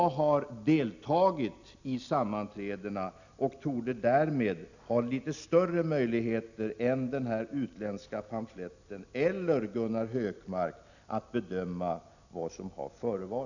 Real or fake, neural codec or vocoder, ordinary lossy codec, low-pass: real; none; none; 7.2 kHz